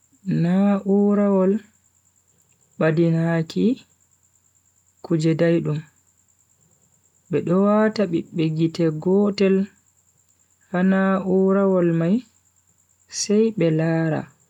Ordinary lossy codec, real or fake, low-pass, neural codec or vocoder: MP3, 96 kbps; real; 19.8 kHz; none